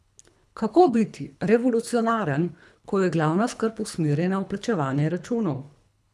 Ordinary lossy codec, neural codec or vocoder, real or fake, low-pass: none; codec, 24 kHz, 3 kbps, HILCodec; fake; none